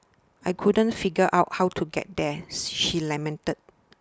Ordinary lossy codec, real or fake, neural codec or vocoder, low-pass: none; real; none; none